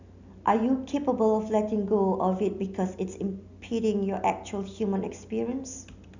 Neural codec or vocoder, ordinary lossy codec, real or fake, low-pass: none; none; real; 7.2 kHz